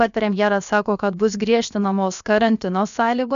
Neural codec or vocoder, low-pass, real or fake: codec, 16 kHz, about 1 kbps, DyCAST, with the encoder's durations; 7.2 kHz; fake